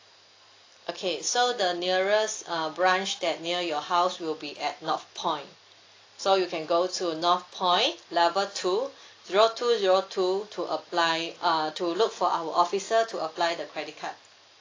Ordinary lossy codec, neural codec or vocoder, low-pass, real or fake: AAC, 32 kbps; none; 7.2 kHz; real